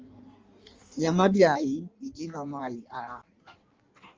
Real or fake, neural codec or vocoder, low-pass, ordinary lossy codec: fake; codec, 16 kHz in and 24 kHz out, 1.1 kbps, FireRedTTS-2 codec; 7.2 kHz; Opus, 32 kbps